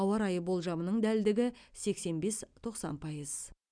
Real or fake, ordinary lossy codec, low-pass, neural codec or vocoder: real; none; 9.9 kHz; none